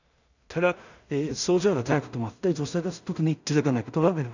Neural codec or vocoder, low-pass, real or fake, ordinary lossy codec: codec, 16 kHz in and 24 kHz out, 0.4 kbps, LongCat-Audio-Codec, two codebook decoder; 7.2 kHz; fake; none